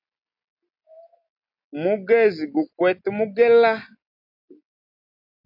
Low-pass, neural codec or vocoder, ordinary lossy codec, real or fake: 5.4 kHz; none; AAC, 48 kbps; real